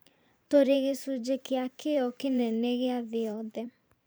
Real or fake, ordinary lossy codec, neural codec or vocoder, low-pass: fake; none; vocoder, 44.1 kHz, 128 mel bands every 512 samples, BigVGAN v2; none